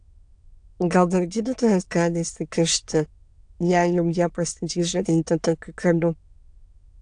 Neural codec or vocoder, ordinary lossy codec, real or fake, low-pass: autoencoder, 22.05 kHz, a latent of 192 numbers a frame, VITS, trained on many speakers; AAC, 64 kbps; fake; 9.9 kHz